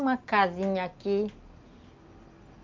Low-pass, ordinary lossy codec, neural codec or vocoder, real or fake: 7.2 kHz; Opus, 24 kbps; none; real